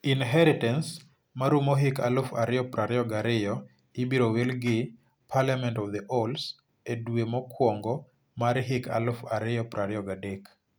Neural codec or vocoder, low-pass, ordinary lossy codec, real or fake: none; none; none; real